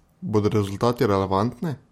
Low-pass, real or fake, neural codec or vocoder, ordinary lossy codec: 19.8 kHz; real; none; MP3, 64 kbps